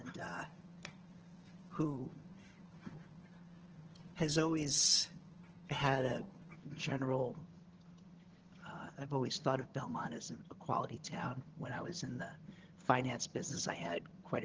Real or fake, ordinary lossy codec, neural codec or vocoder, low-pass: fake; Opus, 16 kbps; vocoder, 22.05 kHz, 80 mel bands, HiFi-GAN; 7.2 kHz